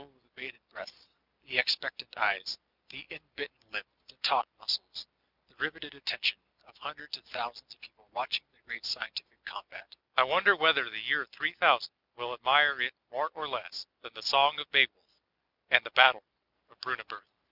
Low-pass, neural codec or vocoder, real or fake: 5.4 kHz; none; real